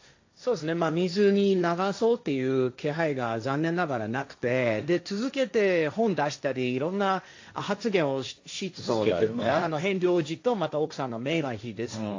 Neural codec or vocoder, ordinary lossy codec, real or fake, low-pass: codec, 16 kHz, 1.1 kbps, Voila-Tokenizer; AAC, 48 kbps; fake; 7.2 kHz